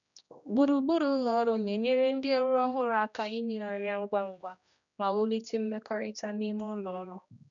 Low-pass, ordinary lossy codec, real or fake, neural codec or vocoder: 7.2 kHz; none; fake; codec, 16 kHz, 1 kbps, X-Codec, HuBERT features, trained on general audio